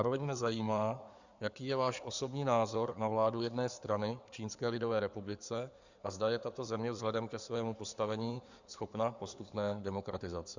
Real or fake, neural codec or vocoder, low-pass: fake; codec, 16 kHz in and 24 kHz out, 2.2 kbps, FireRedTTS-2 codec; 7.2 kHz